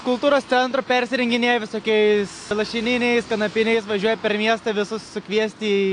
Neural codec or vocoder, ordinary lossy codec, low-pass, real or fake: none; AAC, 48 kbps; 9.9 kHz; real